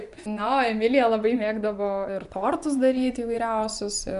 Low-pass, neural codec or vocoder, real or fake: 10.8 kHz; vocoder, 24 kHz, 100 mel bands, Vocos; fake